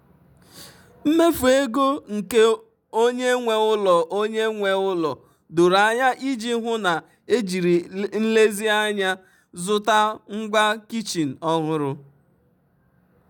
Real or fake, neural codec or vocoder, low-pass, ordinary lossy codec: real; none; 19.8 kHz; none